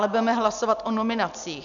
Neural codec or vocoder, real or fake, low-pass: none; real; 7.2 kHz